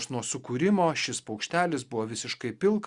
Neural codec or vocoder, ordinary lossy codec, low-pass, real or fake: none; Opus, 64 kbps; 10.8 kHz; real